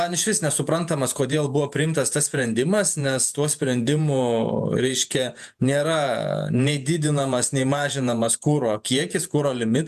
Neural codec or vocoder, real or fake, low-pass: vocoder, 48 kHz, 128 mel bands, Vocos; fake; 14.4 kHz